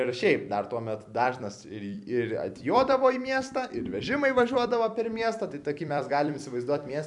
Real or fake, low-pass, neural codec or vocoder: fake; 10.8 kHz; vocoder, 44.1 kHz, 128 mel bands every 256 samples, BigVGAN v2